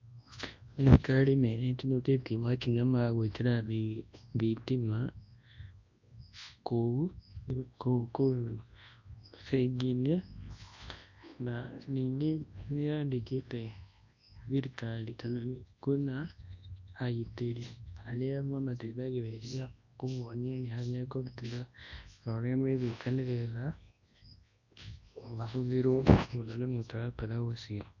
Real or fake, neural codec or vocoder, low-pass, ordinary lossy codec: fake; codec, 24 kHz, 0.9 kbps, WavTokenizer, large speech release; 7.2 kHz; MP3, 48 kbps